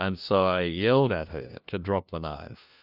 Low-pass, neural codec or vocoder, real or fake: 5.4 kHz; codec, 16 kHz, 1 kbps, FunCodec, trained on LibriTTS, 50 frames a second; fake